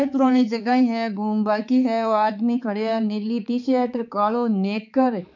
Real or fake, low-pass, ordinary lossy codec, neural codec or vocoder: fake; 7.2 kHz; none; codec, 16 kHz, 4 kbps, X-Codec, HuBERT features, trained on balanced general audio